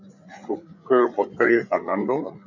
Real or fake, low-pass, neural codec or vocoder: fake; 7.2 kHz; codec, 16 kHz, 8 kbps, FreqCodec, larger model